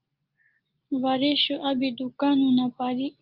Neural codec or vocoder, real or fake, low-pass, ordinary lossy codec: none; real; 5.4 kHz; Opus, 32 kbps